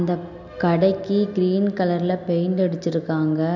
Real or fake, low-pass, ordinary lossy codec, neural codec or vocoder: real; 7.2 kHz; none; none